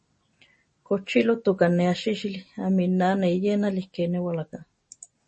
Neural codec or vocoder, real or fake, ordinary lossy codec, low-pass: none; real; MP3, 32 kbps; 10.8 kHz